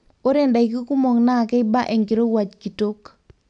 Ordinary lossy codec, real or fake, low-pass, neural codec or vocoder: none; real; 9.9 kHz; none